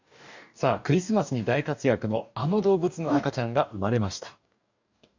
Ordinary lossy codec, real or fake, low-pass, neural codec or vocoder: none; fake; 7.2 kHz; codec, 44.1 kHz, 2.6 kbps, DAC